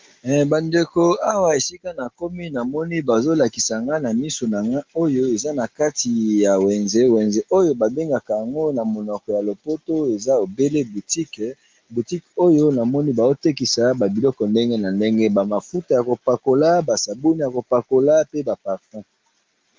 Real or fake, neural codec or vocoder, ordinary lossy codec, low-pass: real; none; Opus, 32 kbps; 7.2 kHz